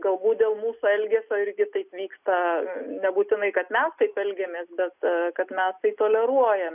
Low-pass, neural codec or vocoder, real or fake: 3.6 kHz; none; real